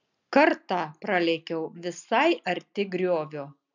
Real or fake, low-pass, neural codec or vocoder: real; 7.2 kHz; none